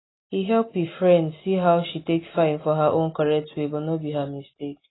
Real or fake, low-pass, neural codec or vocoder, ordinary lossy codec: real; 7.2 kHz; none; AAC, 16 kbps